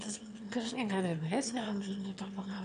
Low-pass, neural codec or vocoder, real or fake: 9.9 kHz; autoencoder, 22.05 kHz, a latent of 192 numbers a frame, VITS, trained on one speaker; fake